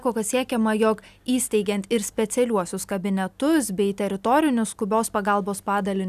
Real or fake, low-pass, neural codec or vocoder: real; 14.4 kHz; none